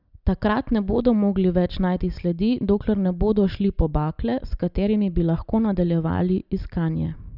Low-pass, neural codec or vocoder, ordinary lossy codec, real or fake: 5.4 kHz; none; none; real